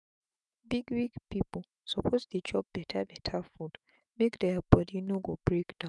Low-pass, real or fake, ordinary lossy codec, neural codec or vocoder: 10.8 kHz; fake; none; autoencoder, 48 kHz, 128 numbers a frame, DAC-VAE, trained on Japanese speech